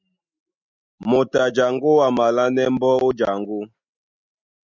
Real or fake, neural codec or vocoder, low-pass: real; none; 7.2 kHz